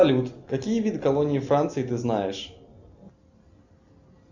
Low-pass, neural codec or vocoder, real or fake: 7.2 kHz; none; real